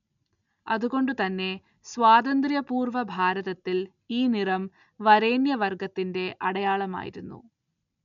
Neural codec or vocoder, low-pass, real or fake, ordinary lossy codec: none; 7.2 kHz; real; none